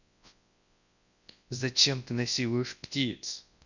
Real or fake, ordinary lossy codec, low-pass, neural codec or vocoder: fake; none; 7.2 kHz; codec, 24 kHz, 0.9 kbps, WavTokenizer, large speech release